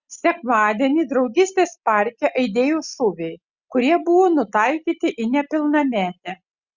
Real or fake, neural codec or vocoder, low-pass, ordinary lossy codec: real; none; 7.2 kHz; Opus, 64 kbps